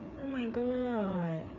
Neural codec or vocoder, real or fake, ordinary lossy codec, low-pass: codec, 16 kHz, 4 kbps, FreqCodec, larger model; fake; none; 7.2 kHz